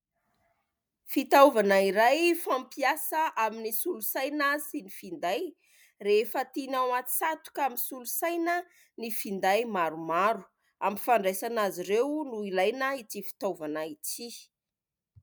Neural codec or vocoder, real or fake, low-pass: none; real; 19.8 kHz